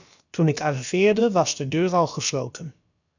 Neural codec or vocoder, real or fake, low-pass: codec, 16 kHz, about 1 kbps, DyCAST, with the encoder's durations; fake; 7.2 kHz